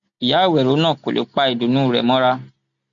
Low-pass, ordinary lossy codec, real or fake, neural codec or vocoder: 7.2 kHz; none; real; none